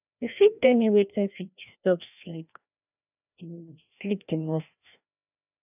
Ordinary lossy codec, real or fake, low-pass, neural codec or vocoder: none; fake; 3.6 kHz; codec, 16 kHz, 1 kbps, FreqCodec, larger model